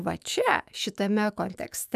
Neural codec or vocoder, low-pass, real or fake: autoencoder, 48 kHz, 128 numbers a frame, DAC-VAE, trained on Japanese speech; 14.4 kHz; fake